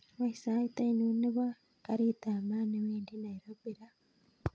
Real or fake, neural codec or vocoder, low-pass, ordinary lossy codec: real; none; none; none